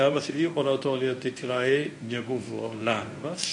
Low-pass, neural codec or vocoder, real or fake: 10.8 kHz; codec, 24 kHz, 0.9 kbps, WavTokenizer, medium speech release version 1; fake